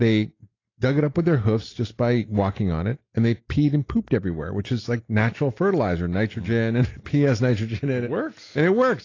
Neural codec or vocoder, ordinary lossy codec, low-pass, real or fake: none; AAC, 32 kbps; 7.2 kHz; real